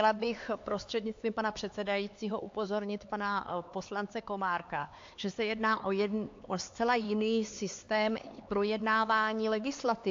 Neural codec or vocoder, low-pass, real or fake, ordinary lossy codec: codec, 16 kHz, 4 kbps, X-Codec, HuBERT features, trained on LibriSpeech; 7.2 kHz; fake; AAC, 48 kbps